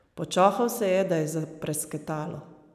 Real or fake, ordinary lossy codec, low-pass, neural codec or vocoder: real; none; 14.4 kHz; none